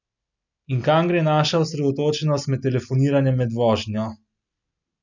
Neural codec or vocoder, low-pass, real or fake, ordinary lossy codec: none; 7.2 kHz; real; none